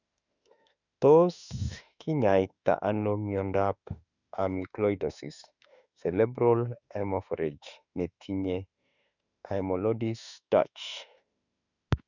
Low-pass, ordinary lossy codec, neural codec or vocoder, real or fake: 7.2 kHz; none; autoencoder, 48 kHz, 32 numbers a frame, DAC-VAE, trained on Japanese speech; fake